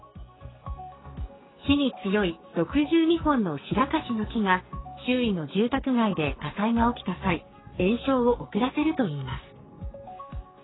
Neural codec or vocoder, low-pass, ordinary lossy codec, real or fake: codec, 44.1 kHz, 2.6 kbps, SNAC; 7.2 kHz; AAC, 16 kbps; fake